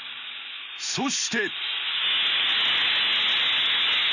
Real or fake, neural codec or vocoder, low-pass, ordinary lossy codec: real; none; 7.2 kHz; none